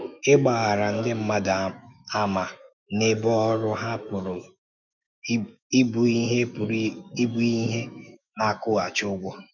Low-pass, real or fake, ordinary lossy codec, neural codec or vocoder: 7.2 kHz; fake; none; autoencoder, 48 kHz, 128 numbers a frame, DAC-VAE, trained on Japanese speech